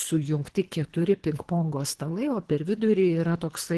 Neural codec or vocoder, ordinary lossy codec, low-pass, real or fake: codec, 24 kHz, 3 kbps, HILCodec; Opus, 16 kbps; 10.8 kHz; fake